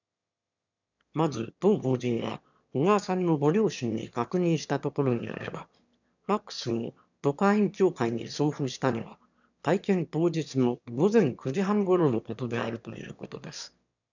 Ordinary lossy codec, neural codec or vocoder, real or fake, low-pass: none; autoencoder, 22.05 kHz, a latent of 192 numbers a frame, VITS, trained on one speaker; fake; 7.2 kHz